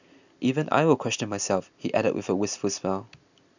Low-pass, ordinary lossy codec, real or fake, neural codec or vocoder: 7.2 kHz; none; real; none